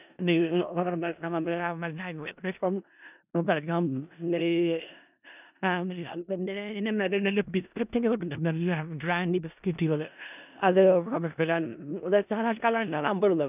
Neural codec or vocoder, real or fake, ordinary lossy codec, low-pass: codec, 16 kHz in and 24 kHz out, 0.4 kbps, LongCat-Audio-Codec, four codebook decoder; fake; none; 3.6 kHz